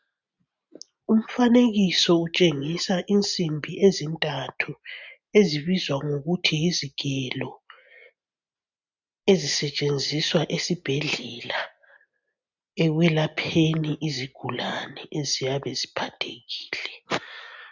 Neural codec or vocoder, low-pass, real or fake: none; 7.2 kHz; real